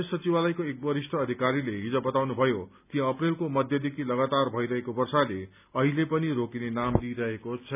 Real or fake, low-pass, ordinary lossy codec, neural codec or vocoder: real; 3.6 kHz; none; none